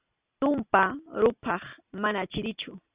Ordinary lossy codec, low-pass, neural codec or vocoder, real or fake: Opus, 64 kbps; 3.6 kHz; none; real